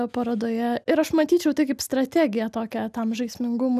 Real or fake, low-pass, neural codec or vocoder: real; 14.4 kHz; none